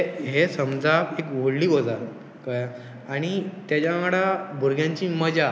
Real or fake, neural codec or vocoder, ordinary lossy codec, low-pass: real; none; none; none